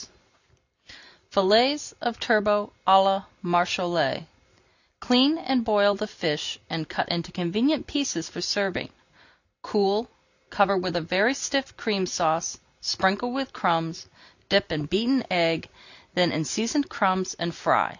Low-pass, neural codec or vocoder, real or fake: 7.2 kHz; none; real